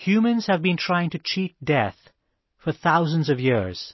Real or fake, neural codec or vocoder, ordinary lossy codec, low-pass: real; none; MP3, 24 kbps; 7.2 kHz